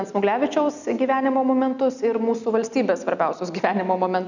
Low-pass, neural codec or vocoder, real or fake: 7.2 kHz; none; real